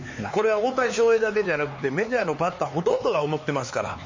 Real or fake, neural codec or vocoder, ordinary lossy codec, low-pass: fake; codec, 16 kHz, 4 kbps, X-Codec, HuBERT features, trained on LibriSpeech; MP3, 32 kbps; 7.2 kHz